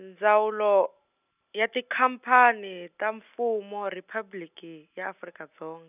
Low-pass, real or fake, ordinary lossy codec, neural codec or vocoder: 3.6 kHz; real; none; none